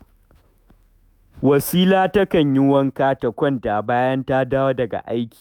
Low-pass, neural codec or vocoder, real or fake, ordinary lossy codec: 19.8 kHz; autoencoder, 48 kHz, 128 numbers a frame, DAC-VAE, trained on Japanese speech; fake; none